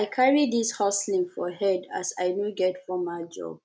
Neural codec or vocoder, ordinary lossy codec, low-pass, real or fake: none; none; none; real